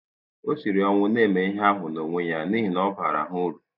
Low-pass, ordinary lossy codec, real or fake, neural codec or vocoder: 5.4 kHz; none; real; none